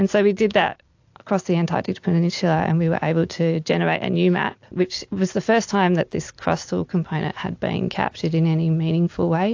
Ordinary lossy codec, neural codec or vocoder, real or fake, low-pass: AAC, 48 kbps; none; real; 7.2 kHz